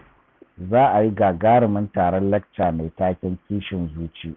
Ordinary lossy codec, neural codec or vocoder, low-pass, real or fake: none; none; none; real